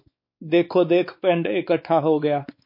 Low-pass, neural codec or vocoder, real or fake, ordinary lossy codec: 5.4 kHz; codec, 16 kHz, 4 kbps, X-Codec, WavLM features, trained on Multilingual LibriSpeech; fake; MP3, 32 kbps